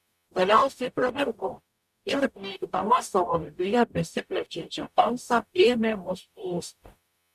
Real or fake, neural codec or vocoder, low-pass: fake; codec, 44.1 kHz, 0.9 kbps, DAC; 14.4 kHz